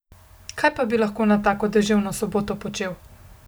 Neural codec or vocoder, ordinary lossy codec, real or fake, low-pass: none; none; real; none